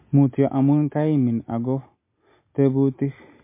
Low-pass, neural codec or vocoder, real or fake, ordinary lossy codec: 3.6 kHz; none; real; MP3, 24 kbps